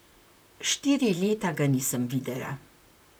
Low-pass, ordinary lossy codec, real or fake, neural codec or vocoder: none; none; fake; vocoder, 44.1 kHz, 128 mel bands, Pupu-Vocoder